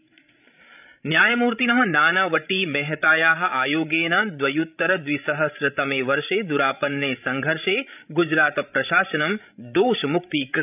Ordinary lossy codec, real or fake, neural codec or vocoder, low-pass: none; fake; codec, 16 kHz, 16 kbps, FreqCodec, larger model; 3.6 kHz